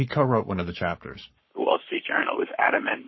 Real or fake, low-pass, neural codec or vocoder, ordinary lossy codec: fake; 7.2 kHz; codec, 44.1 kHz, 7.8 kbps, Pupu-Codec; MP3, 24 kbps